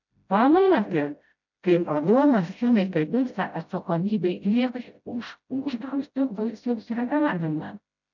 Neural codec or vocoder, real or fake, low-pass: codec, 16 kHz, 0.5 kbps, FreqCodec, smaller model; fake; 7.2 kHz